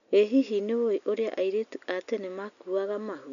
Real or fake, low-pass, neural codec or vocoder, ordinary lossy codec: real; 7.2 kHz; none; none